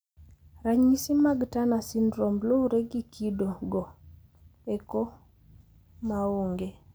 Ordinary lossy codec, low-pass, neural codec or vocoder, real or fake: none; none; none; real